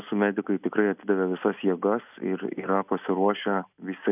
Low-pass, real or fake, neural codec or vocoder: 3.6 kHz; real; none